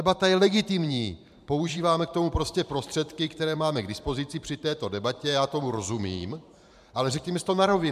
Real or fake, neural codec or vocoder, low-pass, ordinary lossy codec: fake; vocoder, 44.1 kHz, 128 mel bands every 512 samples, BigVGAN v2; 14.4 kHz; MP3, 96 kbps